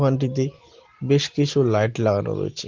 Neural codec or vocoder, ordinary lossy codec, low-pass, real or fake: none; Opus, 16 kbps; 7.2 kHz; real